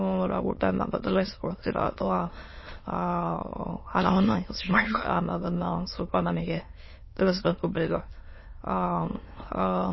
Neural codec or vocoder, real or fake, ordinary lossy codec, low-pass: autoencoder, 22.05 kHz, a latent of 192 numbers a frame, VITS, trained on many speakers; fake; MP3, 24 kbps; 7.2 kHz